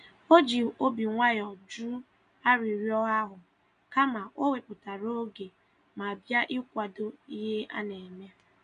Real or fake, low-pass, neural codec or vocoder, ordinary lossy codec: real; 9.9 kHz; none; none